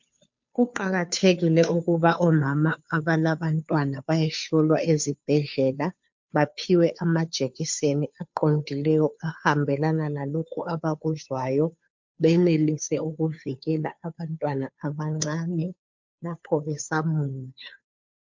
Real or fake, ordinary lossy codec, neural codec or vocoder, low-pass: fake; MP3, 48 kbps; codec, 16 kHz, 2 kbps, FunCodec, trained on Chinese and English, 25 frames a second; 7.2 kHz